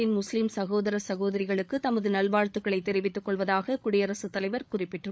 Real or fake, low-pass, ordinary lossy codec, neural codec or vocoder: fake; none; none; codec, 16 kHz, 8 kbps, FreqCodec, larger model